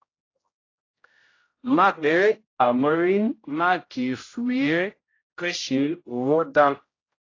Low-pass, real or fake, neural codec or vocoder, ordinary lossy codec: 7.2 kHz; fake; codec, 16 kHz, 0.5 kbps, X-Codec, HuBERT features, trained on general audio; AAC, 32 kbps